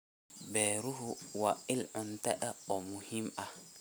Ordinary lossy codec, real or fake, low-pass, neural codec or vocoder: none; real; none; none